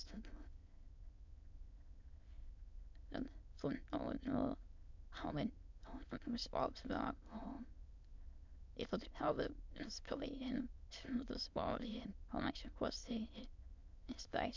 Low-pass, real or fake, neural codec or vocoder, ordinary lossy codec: 7.2 kHz; fake; autoencoder, 22.05 kHz, a latent of 192 numbers a frame, VITS, trained on many speakers; MP3, 64 kbps